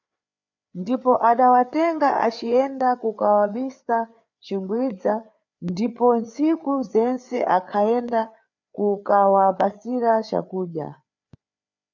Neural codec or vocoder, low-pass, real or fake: codec, 16 kHz, 4 kbps, FreqCodec, larger model; 7.2 kHz; fake